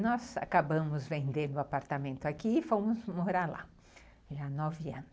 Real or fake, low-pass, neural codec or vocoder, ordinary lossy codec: real; none; none; none